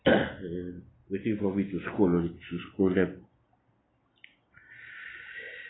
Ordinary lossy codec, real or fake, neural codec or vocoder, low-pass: AAC, 16 kbps; real; none; 7.2 kHz